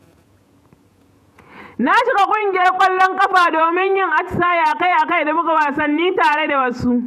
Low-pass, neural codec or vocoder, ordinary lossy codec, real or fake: 14.4 kHz; vocoder, 48 kHz, 128 mel bands, Vocos; none; fake